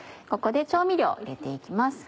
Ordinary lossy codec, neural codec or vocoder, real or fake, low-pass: none; none; real; none